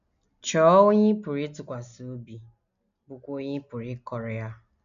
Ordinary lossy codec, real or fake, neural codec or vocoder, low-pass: none; real; none; 7.2 kHz